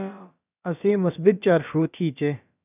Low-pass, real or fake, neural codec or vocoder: 3.6 kHz; fake; codec, 16 kHz, about 1 kbps, DyCAST, with the encoder's durations